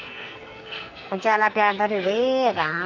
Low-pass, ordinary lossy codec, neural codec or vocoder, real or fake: 7.2 kHz; none; codec, 44.1 kHz, 2.6 kbps, SNAC; fake